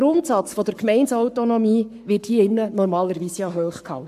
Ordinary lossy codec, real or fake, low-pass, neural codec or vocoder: AAC, 96 kbps; fake; 14.4 kHz; codec, 44.1 kHz, 7.8 kbps, Pupu-Codec